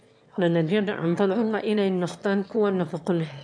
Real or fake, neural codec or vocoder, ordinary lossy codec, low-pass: fake; autoencoder, 22.05 kHz, a latent of 192 numbers a frame, VITS, trained on one speaker; MP3, 64 kbps; 9.9 kHz